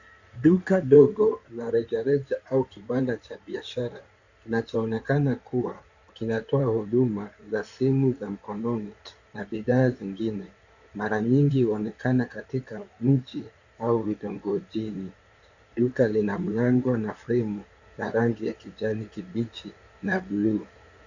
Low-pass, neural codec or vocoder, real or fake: 7.2 kHz; codec, 16 kHz in and 24 kHz out, 2.2 kbps, FireRedTTS-2 codec; fake